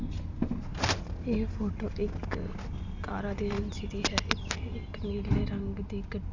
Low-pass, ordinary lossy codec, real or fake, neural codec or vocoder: 7.2 kHz; none; real; none